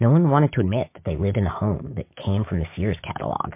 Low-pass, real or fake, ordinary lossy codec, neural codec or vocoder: 3.6 kHz; fake; MP3, 24 kbps; codec, 16 kHz, 6 kbps, DAC